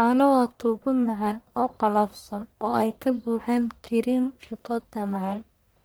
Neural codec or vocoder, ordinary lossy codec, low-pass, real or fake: codec, 44.1 kHz, 1.7 kbps, Pupu-Codec; none; none; fake